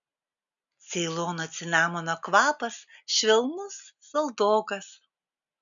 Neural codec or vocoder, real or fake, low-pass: none; real; 7.2 kHz